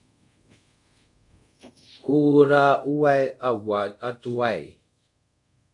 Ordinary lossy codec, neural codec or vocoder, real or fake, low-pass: AAC, 64 kbps; codec, 24 kHz, 0.5 kbps, DualCodec; fake; 10.8 kHz